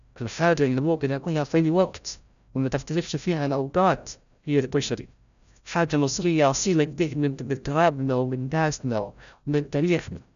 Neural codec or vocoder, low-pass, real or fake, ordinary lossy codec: codec, 16 kHz, 0.5 kbps, FreqCodec, larger model; 7.2 kHz; fake; none